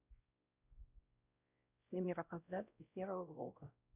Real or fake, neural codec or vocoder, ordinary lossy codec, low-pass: fake; codec, 16 kHz, 0.5 kbps, X-Codec, WavLM features, trained on Multilingual LibriSpeech; none; 3.6 kHz